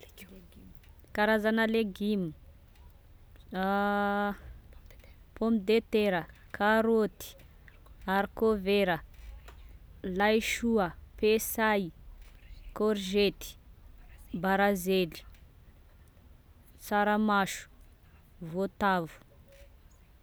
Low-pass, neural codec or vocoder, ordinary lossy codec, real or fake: none; none; none; real